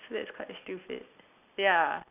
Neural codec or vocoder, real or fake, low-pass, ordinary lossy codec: none; real; 3.6 kHz; none